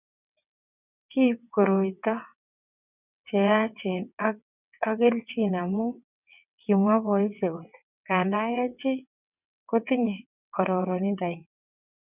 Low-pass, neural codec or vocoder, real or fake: 3.6 kHz; vocoder, 22.05 kHz, 80 mel bands, WaveNeXt; fake